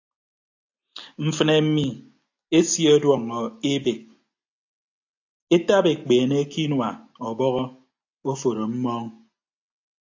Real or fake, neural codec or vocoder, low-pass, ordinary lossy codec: real; none; 7.2 kHz; AAC, 48 kbps